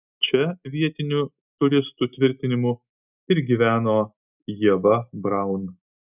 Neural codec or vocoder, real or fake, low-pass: none; real; 3.6 kHz